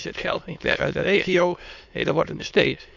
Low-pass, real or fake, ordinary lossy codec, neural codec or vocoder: 7.2 kHz; fake; none; autoencoder, 22.05 kHz, a latent of 192 numbers a frame, VITS, trained on many speakers